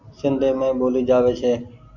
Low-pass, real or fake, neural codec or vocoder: 7.2 kHz; real; none